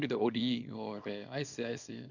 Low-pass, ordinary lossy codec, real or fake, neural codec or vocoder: 7.2 kHz; Opus, 64 kbps; fake; codec, 24 kHz, 0.9 kbps, WavTokenizer, small release